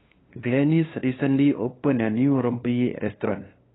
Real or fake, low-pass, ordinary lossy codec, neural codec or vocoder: fake; 7.2 kHz; AAC, 16 kbps; codec, 16 kHz, 2 kbps, FunCodec, trained on LibriTTS, 25 frames a second